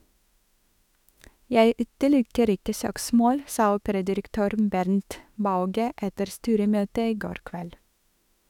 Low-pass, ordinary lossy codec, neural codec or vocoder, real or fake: 19.8 kHz; none; autoencoder, 48 kHz, 32 numbers a frame, DAC-VAE, trained on Japanese speech; fake